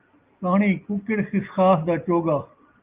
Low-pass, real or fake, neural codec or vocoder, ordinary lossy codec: 3.6 kHz; real; none; Opus, 32 kbps